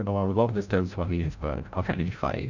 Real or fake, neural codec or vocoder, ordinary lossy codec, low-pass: fake; codec, 16 kHz, 0.5 kbps, FreqCodec, larger model; none; 7.2 kHz